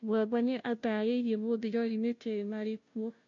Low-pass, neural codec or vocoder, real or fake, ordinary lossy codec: 7.2 kHz; codec, 16 kHz, 0.5 kbps, FunCodec, trained on Chinese and English, 25 frames a second; fake; MP3, 48 kbps